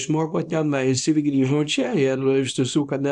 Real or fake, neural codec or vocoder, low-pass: fake; codec, 24 kHz, 0.9 kbps, WavTokenizer, small release; 10.8 kHz